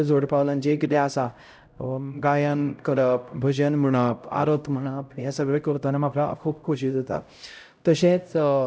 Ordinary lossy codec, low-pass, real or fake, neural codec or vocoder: none; none; fake; codec, 16 kHz, 0.5 kbps, X-Codec, HuBERT features, trained on LibriSpeech